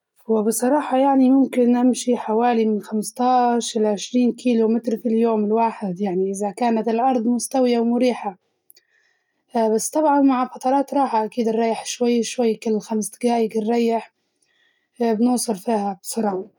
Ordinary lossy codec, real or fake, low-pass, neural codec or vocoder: none; real; 19.8 kHz; none